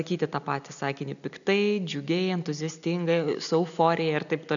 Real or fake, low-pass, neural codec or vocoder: real; 7.2 kHz; none